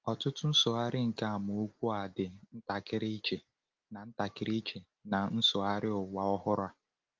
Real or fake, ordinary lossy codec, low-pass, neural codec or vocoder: real; Opus, 32 kbps; 7.2 kHz; none